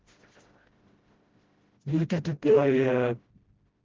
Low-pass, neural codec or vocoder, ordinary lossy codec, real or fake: 7.2 kHz; codec, 16 kHz, 0.5 kbps, FreqCodec, smaller model; Opus, 16 kbps; fake